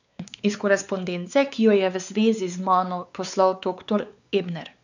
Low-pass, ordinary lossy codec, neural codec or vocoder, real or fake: 7.2 kHz; none; codec, 16 kHz, 4 kbps, X-Codec, WavLM features, trained on Multilingual LibriSpeech; fake